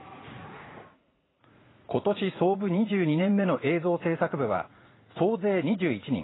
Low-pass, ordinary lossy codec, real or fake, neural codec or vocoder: 7.2 kHz; AAC, 16 kbps; fake; vocoder, 44.1 kHz, 80 mel bands, Vocos